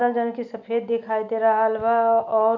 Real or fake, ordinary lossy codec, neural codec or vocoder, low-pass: real; none; none; 7.2 kHz